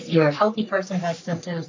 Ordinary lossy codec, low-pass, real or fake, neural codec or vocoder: AAC, 48 kbps; 7.2 kHz; fake; codec, 44.1 kHz, 3.4 kbps, Pupu-Codec